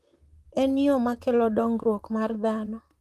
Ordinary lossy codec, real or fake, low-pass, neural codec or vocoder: Opus, 32 kbps; fake; 14.4 kHz; vocoder, 44.1 kHz, 128 mel bands, Pupu-Vocoder